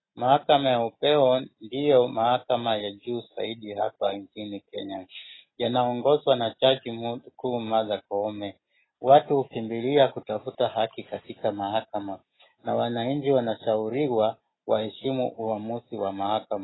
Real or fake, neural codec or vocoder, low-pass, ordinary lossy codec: real; none; 7.2 kHz; AAC, 16 kbps